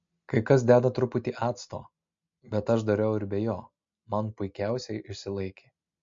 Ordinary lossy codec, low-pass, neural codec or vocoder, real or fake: MP3, 48 kbps; 7.2 kHz; none; real